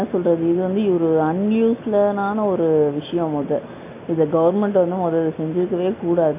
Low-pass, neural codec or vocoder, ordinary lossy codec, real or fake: 3.6 kHz; none; AAC, 32 kbps; real